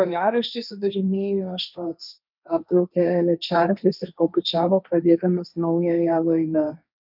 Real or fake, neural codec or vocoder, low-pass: fake; codec, 16 kHz, 1.1 kbps, Voila-Tokenizer; 5.4 kHz